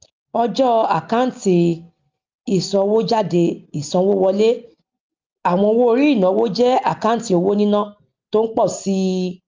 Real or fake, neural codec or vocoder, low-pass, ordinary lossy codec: real; none; 7.2 kHz; Opus, 24 kbps